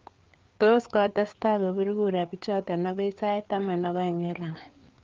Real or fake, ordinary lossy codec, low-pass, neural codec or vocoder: fake; Opus, 32 kbps; 7.2 kHz; codec, 16 kHz, 4 kbps, FreqCodec, larger model